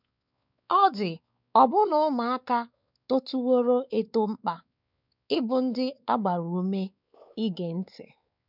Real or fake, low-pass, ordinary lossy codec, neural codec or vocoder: fake; 5.4 kHz; none; codec, 16 kHz, 4 kbps, X-Codec, WavLM features, trained on Multilingual LibriSpeech